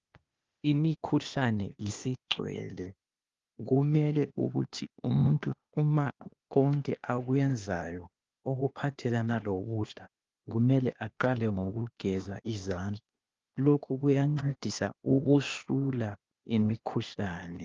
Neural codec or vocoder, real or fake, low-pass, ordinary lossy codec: codec, 16 kHz, 0.8 kbps, ZipCodec; fake; 7.2 kHz; Opus, 32 kbps